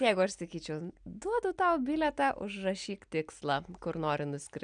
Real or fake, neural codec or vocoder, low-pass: real; none; 9.9 kHz